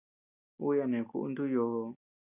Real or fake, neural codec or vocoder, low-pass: real; none; 3.6 kHz